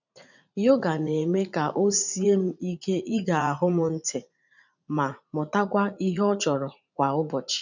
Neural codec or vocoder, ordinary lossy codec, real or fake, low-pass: vocoder, 44.1 kHz, 80 mel bands, Vocos; none; fake; 7.2 kHz